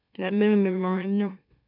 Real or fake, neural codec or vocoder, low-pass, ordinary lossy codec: fake; autoencoder, 44.1 kHz, a latent of 192 numbers a frame, MeloTTS; 5.4 kHz; none